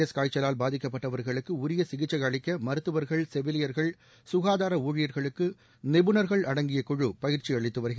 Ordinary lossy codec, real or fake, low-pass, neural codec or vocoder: none; real; none; none